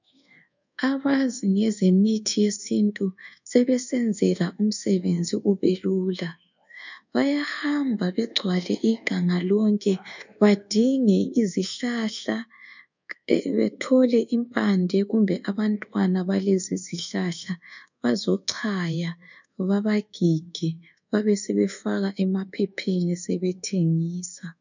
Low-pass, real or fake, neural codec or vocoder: 7.2 kHz; fake; codec, 24 kHz, 1.2 kbps, DualCodec